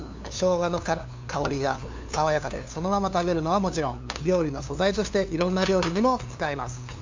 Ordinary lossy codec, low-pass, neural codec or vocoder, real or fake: MP3, 64 kbps; 7.2 kHz; codec, 16 kHz, 2 kbps, FunCodec, trained on LibriTTS, 25 frames a second; fake